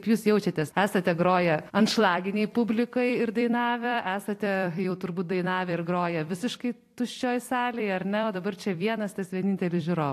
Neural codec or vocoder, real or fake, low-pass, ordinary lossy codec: vocoder, 44.1 kHz, 128 mel bands every 256 samples, BigVGAN v2; fake; 14.4 kHz; AAC, 64 kbps